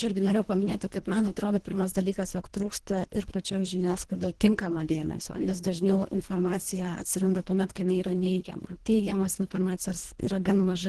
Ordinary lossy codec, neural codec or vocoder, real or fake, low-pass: Opus, 16 kbps; codec, 24 kHz, 1.5 kbps, HILCodec; fake; 10.8 kHz